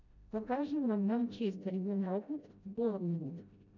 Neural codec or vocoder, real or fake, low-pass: codec, 16 kHz, 0.5 kbps, FreqCodec, smaller model; fake; 7.2 kHz